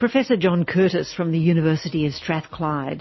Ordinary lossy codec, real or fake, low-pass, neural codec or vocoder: MP3, 24 kbps; real; 7.2 kHz; none